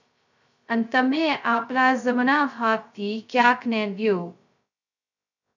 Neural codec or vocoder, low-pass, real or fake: codec, 16 kHz, 0.2 kbps, FocalCodec; 7.2 kHz; fake